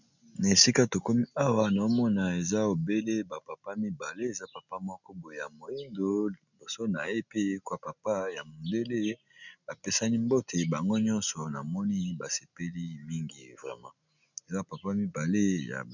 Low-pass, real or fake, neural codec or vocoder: 7.2 kHz; real; none